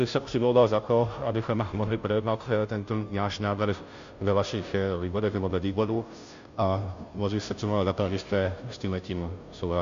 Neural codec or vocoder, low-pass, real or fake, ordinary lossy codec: codec, 16 kHz, 0.5 kbps, FunCodec, trained on Chinese and English, 25 frames a second; 7.2 kHz; fake; MP3, 64 kbps